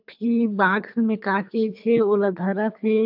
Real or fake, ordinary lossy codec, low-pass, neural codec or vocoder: fake; AAC, 48 kbps; 5.4 kHz; codec, 24 kHz, 3 kbps, HILCodec